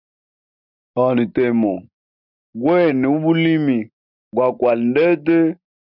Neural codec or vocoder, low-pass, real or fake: none; 5.4 kHz; real